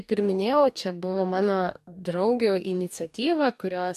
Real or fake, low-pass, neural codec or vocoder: fake; 14.4 kHz; codec, 44.1 kHz, 2.6 kbps, DAC